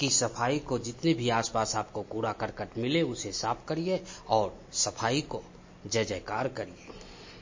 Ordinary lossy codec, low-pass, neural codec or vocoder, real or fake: MP3, 32 kbps; 7.2 kHz; none; real